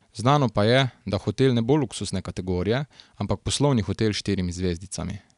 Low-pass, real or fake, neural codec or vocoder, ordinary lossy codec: 10.8 kHz; real; none; none